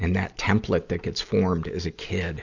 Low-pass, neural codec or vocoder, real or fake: 7.2 kHz; none; real